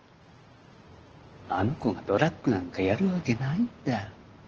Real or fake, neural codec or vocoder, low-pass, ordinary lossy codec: real; none; 7.2 kHz; Opus, 16 kbps